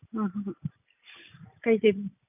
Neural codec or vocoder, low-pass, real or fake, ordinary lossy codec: none; 3.6 kHz; real; none